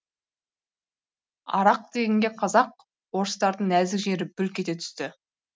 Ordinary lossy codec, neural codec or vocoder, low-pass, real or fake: none; none; none; real